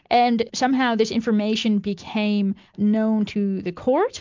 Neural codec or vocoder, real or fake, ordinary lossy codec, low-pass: none; real; MP3, 64 kbps; 7.2 kHz